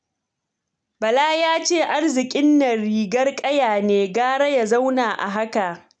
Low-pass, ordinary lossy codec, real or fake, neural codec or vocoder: 9.9 kHz; none; real; none